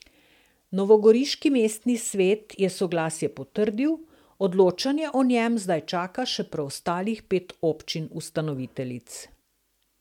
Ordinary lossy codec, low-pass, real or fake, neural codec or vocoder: MP3, 96 kbps; 19.8 kHz; real; none